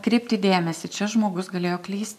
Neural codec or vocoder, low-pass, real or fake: none; 14.4 kHz; real